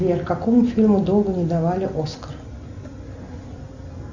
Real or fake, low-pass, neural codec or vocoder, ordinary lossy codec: real; 7.2 kHz; none; Opus, 64 kbps